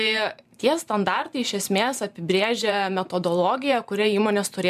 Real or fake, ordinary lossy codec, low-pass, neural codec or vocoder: fake; MP3, 96 kbps; 14.4 kHz; vocoder, 44.1 kHz, 128 mel bands every 512 samples, BigVGAN v2